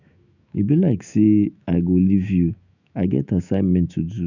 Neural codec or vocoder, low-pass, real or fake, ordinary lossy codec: autoencoder, 48 kHz, 128 numbers a frame, DAC-VAE, trained on Japanese speech; 7.2 kHz; fake; none